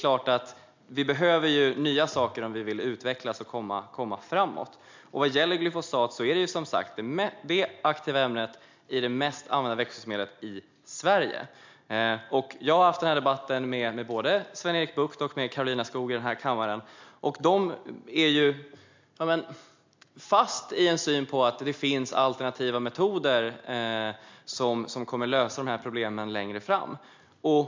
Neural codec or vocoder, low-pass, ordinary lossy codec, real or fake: none; 7.2 kHz; MP3, 64 kbps; real